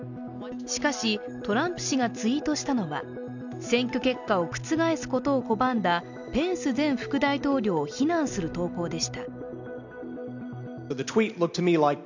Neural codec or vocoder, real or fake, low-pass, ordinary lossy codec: none; real; 7.2 kHz; none